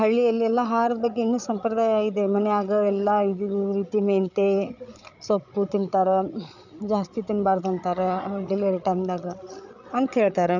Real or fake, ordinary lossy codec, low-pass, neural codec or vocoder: fake; none; 7.2 kHz; codec, 16 kHz, 8 kbps, FreqCodec, larger model